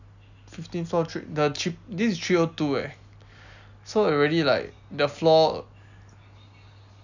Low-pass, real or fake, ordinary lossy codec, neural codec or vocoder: 7.2 kHz; real; none; none